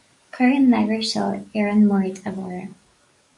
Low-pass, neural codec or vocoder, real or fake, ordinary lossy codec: 10.8 kHz; codec, 44.1 kHz, 7.8 kbps, DAC; fake; MP3, 64 kbps